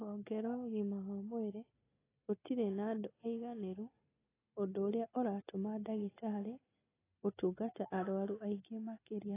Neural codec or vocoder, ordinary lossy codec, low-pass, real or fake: none; AAC, 16 kbps; 3.6 kHz; real